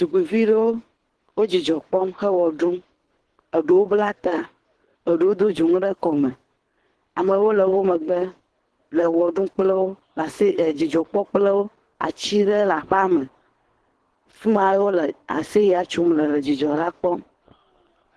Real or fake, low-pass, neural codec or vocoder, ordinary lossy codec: fake; 10.8 kHz; codec, 24 kHz, 3 kbps, HILCodec; Opus, 16 kbps